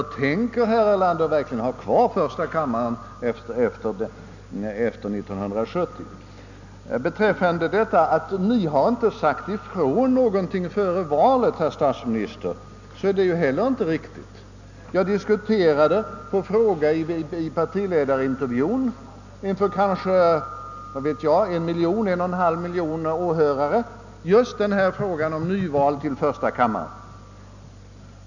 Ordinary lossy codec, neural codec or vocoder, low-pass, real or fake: none; none; 7.2 kHz; real